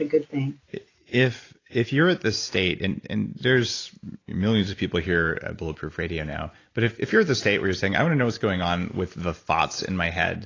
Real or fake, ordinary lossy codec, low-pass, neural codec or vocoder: real; AAC, 32 kbps; 7.2 kHz; none